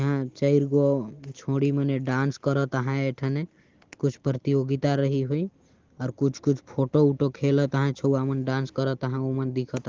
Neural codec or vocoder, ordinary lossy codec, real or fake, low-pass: none; Opus, 16 kbps; real; 7.2 kHz